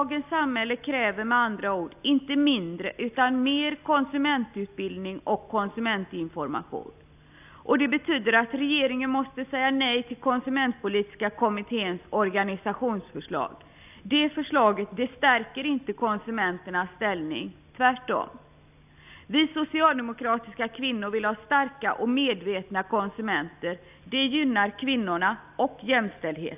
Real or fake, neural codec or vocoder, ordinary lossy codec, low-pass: real; none; none; 3.6 kHz